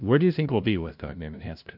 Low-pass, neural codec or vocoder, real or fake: 5.4 kHz; codec, 16 kHz, 0.5 kbps, FunCodec, trained on LibriTTS, 25 frames a second; fake